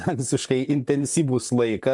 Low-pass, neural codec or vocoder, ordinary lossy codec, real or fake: 10.8 kHz; vocoder, 44.1 kHz, 128 mel bands, Pupu-Vocoder; MP3, 96 kbps; fake